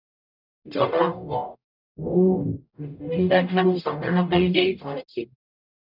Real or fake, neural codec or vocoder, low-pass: fake; codec, 44.1 kHz, 0.9 kbps, DAC; 5.4 kHz